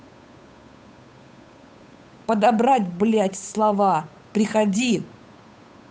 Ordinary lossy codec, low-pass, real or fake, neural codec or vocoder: none; none; fake; codec, 16 kHz, 8 kbps, FunCodec, trained on Chinese and English, 25 frames a second